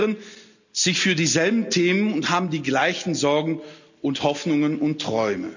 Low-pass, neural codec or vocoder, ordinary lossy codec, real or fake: 7.2 kHz; none; none; real